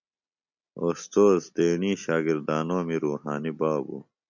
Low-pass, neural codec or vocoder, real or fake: 7.2 kHz; none; real